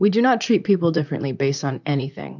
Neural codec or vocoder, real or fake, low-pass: vocoder, 44.1 kHz, 128 mel bands, Pupu-Vocoder; fake; 7.2 kHz